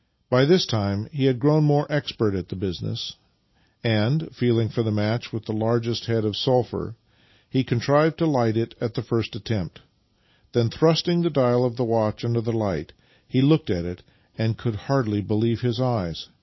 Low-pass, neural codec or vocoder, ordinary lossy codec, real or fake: 7.2 kHz; none; MP3, 24 kbps; real